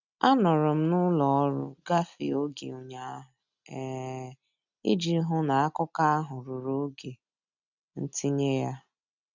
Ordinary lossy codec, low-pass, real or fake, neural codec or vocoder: none; 7.2 kHz; real; none